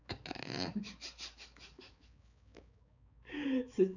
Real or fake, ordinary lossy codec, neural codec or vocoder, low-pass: fake; none; codec, 16 kHz, 4 kbps, X-Codec, HuBERT features, trained on balanced general audio; 7.2 kHz